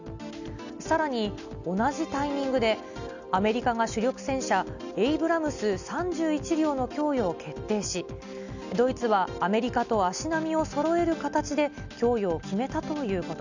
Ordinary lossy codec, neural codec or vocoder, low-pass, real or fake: none; none; 7.2 kHz; real